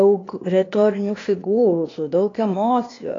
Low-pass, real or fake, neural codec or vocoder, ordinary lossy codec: 7.2 kHz; fake; codec, 16 kHz, 0.8 kbps, ZipCodec; AAC, 32 kbps